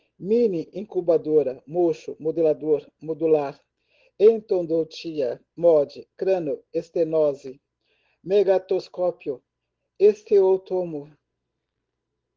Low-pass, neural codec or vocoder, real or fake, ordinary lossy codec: 7.2 kHz; none; real; Opus, 16 kbps